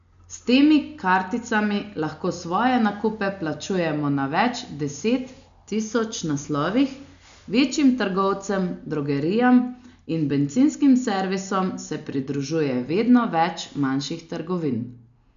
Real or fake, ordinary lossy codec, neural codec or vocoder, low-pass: real; MP3, 64 kbps; none; 7.2 kHz